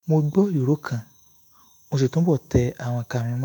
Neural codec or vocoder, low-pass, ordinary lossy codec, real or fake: autoencoder, 48 kHz, 128 numbers a frame, DAC-VAE, trained on Japanese speech; none; none; fake